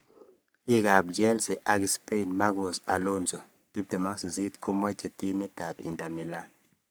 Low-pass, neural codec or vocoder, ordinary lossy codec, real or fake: none; codec, 44.1 kHz, 3.4 kbps, Pupu-Codec; none; fake